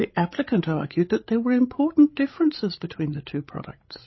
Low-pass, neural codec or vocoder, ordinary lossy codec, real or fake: 7.2 kHz; codec, 16 kHz, 16 kbps, FreqCodec, larger model; MP3, 24 kbps; fake